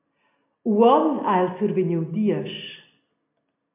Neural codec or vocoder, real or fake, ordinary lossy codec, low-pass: none; real; MP3, 32 kbps; 3.6 kHz